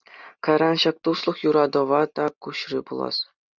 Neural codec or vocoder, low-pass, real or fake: none; 7.2 kHz; real